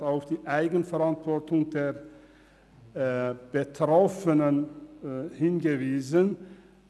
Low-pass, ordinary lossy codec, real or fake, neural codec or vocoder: none; none; real; none